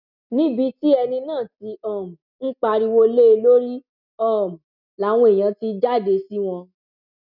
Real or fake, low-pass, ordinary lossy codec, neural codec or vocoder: real; 5.4 kHz; none; none